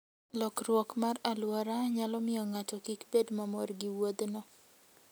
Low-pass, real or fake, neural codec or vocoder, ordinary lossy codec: none; real; none; none